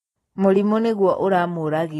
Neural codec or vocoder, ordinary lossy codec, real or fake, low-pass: none; AAC, 32 kbps; real; 19.8 kHz